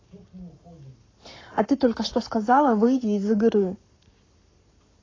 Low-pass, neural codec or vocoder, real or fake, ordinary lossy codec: 7.2 kHz; codec, 44.1 kHz, 7.8 kbps, Pupu-Codec; fake; AAC, 32 kbps